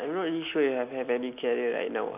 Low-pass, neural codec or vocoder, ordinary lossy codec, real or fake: 3.6 kHz; none; none; real